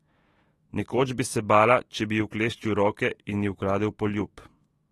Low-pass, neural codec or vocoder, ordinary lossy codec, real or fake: 19.8 kHz; autoencoder, 48 kHz, 128 numbers a frame, DAC-VAE, trained on Japanese speech; AAC, 32 kbps; fake